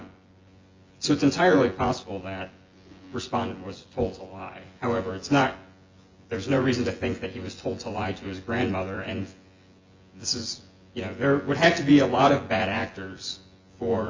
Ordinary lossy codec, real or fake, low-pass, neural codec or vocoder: Opus, 32 kbps; fake; 7.2 kHz; vocoder, 24 kHz, 100 mel bands, Vocos